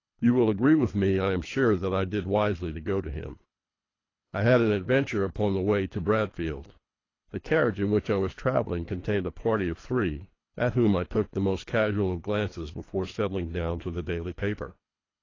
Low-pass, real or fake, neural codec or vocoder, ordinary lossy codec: 7.2 kHz; fake; codec, 24 kHz, 3 kbps, HILCodec; AAC, 32 kbps